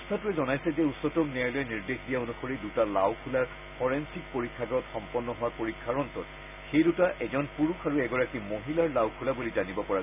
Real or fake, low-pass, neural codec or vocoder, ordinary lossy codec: real; 3.6 kHz; none; none